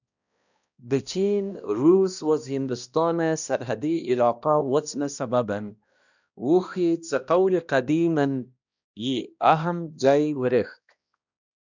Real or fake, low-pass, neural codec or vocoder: fake; 7.2 kHz; codec, 16 kHz, 1 kbps, X-Codec, HuBERT features, trained on balanced general audio